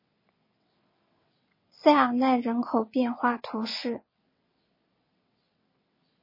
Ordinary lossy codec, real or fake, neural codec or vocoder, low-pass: MP3, 24 kbps; fake; vocoder, 22.05 kHz, 80 mel bands, WaveNeXt; 5.4 kHz